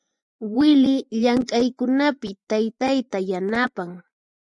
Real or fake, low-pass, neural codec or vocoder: fake; 10.8 kHz; vocoder, 24 kHz, 100 mel bands, Vocos